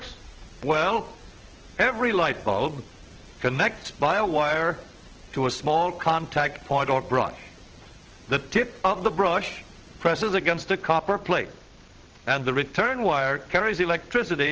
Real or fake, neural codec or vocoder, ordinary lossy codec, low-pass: fake; vocoder, 22.05 kHz, 80 mel bands, WaveNeXt; Opus, 16 kbps; 7.2 kHz